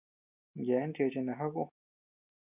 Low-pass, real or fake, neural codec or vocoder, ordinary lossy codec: 3.6 kHz; real; none; Opus, 64 kbps